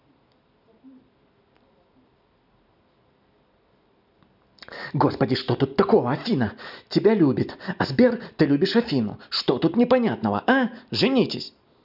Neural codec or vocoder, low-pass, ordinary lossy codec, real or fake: none; 5.4 kHz; none; real